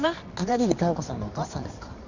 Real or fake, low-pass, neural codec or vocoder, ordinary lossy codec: fake; 7.2 kHz; codec, 24 kHz, 0.9 kbps, WavTokenizer, medium music audio release; none